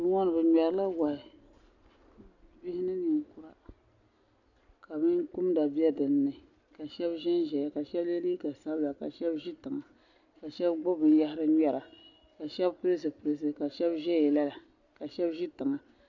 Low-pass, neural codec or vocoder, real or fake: 7.2 kHz; none; real